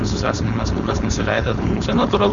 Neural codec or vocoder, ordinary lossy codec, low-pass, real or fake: codec, 16 kHz, 4.8 kbps, FACodec; Opus, 64 kbps; 7.2 kHz; fake